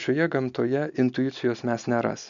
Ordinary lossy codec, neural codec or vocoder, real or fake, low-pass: MP3, 96 kbps; none; real; 7.2 kHz